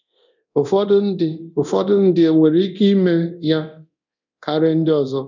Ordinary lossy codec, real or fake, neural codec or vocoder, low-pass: none; fake; codec, 24 kHz, 0.9 kbps, DualCodec; 7.2 kHz